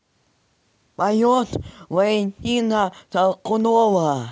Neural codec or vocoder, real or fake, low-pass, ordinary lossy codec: none; real; none; none